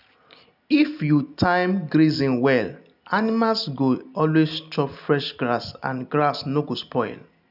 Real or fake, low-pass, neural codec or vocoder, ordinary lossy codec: real; 5.4 kHz; none; none